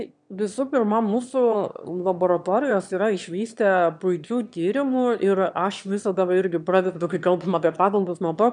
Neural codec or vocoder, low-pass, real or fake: autoencoder, 22.05 kHz, a latent of 192 numbers a frame, VITS, trained on one speaker; 9.9 kHz; fake